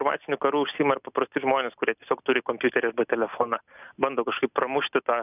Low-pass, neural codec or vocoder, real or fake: 3.6 kHz; none; real